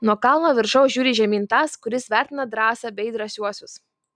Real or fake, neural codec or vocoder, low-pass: real; none; 9.9 kHz